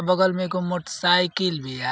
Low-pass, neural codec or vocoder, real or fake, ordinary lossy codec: none; none; real; none